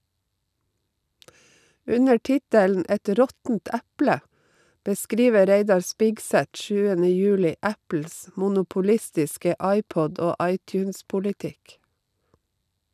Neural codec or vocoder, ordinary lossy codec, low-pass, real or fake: none; none; 14.4 kHz; real